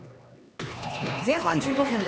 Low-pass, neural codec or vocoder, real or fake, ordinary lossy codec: none; codec, 16 kHz, 2 kbps, X-Codec, HuBERT features, trained on LibriSpeech; fake; none